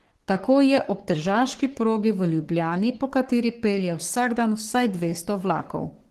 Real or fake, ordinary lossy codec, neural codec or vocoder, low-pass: fake; Opus, 16 kbps; codec, 44.1 kHz, 3.4 kbps, Pupu-Codec; 14.4 kHz